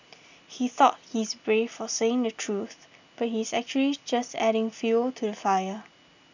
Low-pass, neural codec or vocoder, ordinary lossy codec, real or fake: 7.2 kHz; none; none; real